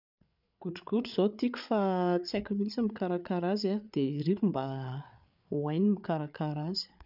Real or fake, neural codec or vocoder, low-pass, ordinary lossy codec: fake; codec, 16 kHz, 8 kbps, FreqCodec, larger model; 5.4 kHz; none